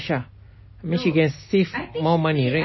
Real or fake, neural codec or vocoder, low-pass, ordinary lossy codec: real; none; 7.2 kHz; MP3, 24 kbps